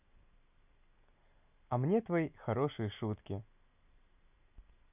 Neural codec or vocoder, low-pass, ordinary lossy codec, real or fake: none; 3.6 kHz; none; real